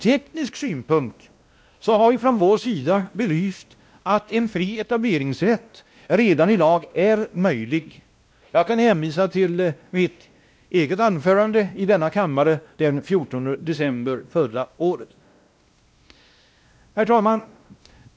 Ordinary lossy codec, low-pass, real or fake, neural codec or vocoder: none; none; fake; codec, 16 kHz, 1 kbps, X-Codec, WavLM features, trained on Multilingual LibriSpeech